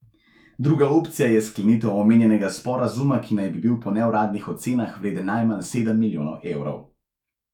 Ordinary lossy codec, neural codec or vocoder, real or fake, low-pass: none; autoencoder, 48 kHz, 128 numbers a frame, DAC-VAE, trained on Japanese speech; fake; 19.8 kHz